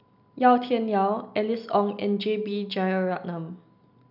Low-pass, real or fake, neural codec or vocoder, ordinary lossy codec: 5.4 kHz; real; none; none